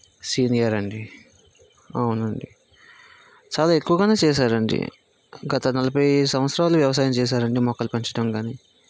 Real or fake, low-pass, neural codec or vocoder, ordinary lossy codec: real; none; none; none